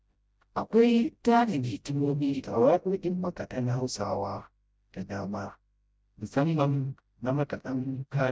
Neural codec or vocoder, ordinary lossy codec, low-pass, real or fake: codec, 16 kHz, 0.5 kbps, FreqCodec, smaller model; none; none; fake